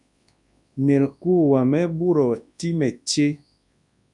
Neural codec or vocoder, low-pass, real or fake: codec, 24 kHz, 0.9 kbps, WavTokenizer, large speech release; 10.8 kHz; fake